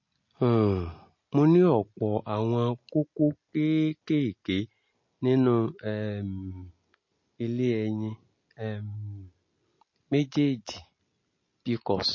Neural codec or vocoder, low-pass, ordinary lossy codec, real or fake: none; 7.2 kHz; MP3, 32 kbps; real